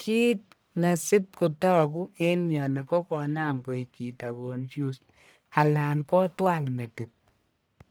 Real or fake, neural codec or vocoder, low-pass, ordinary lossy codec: fake; codec, 44.1 kHz, 1.7 kbps, Pupu-Codec; none; none